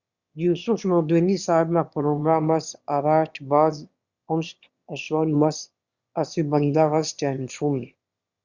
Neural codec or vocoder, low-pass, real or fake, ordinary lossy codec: autoencoder, 22.05 kHz, a latent of 192 numbers a frame, VITS, trained on one speaker; 7.2 kHz; fake; Opus, 64 kbps